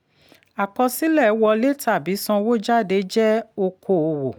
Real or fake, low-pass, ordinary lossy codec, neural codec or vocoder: real; none; none; none